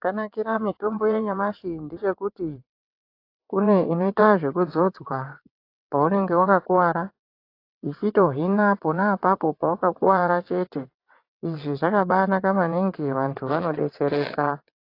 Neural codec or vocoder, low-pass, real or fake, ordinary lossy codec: vocoder, 22.05 kHz, 80 mel bands, WaveNeXt; 5.4 kHz; fake; AAC, 32 kbps